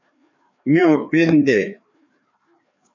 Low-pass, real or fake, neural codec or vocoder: 7.2 kHz; fake; codec, 16 kHz, 2 kbps, FreqCodec, larger model